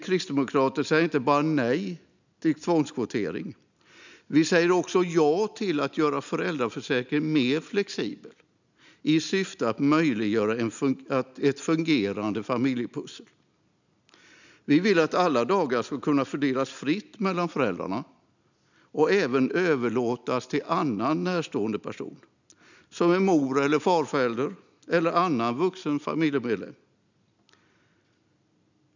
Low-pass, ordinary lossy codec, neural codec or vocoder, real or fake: 7.2 kHz; none; none; real